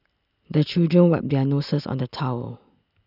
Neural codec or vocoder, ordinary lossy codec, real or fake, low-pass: none; none; real; 5.4 kHz